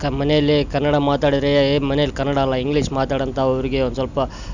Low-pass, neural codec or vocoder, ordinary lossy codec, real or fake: 7.2 kHz; none; none; real